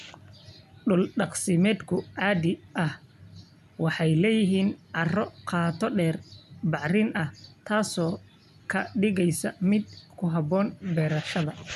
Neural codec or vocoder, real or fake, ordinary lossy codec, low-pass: none; real; none; 14.4 kHz